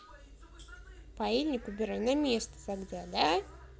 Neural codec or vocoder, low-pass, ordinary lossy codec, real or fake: none; none; none; real